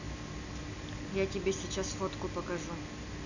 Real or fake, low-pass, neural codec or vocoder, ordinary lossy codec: real; 7.2 kHz; none; none